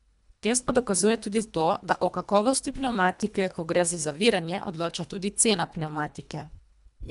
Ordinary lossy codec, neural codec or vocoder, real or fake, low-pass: none; codec, 24 kHz, 1.5 kbps, HILCodec; fake; 10.8 kHz